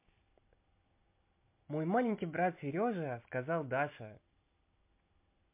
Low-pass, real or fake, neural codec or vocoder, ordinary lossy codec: 3.6 kHz; real; none; MP3, 32 kbps